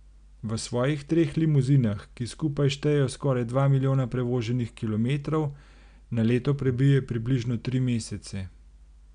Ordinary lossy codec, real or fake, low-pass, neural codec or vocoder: none; real; 9.9 kHz; none